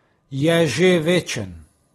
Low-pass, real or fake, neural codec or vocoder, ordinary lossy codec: 19.8 kHz; real; none; AAC, 32 kbps